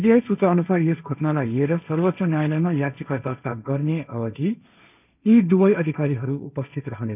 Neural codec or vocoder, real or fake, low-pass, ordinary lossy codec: codec, 16 kHz, 1.1 kbps, Voila-Tokenizer; fake; 3.6 kHz; none